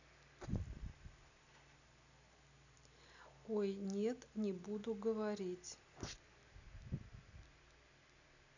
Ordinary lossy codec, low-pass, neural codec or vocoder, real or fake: none; 7.2 kHz; vocoder, 44.1 kHz, 128 mel bands every 256 samples, BigVGAN v2; fake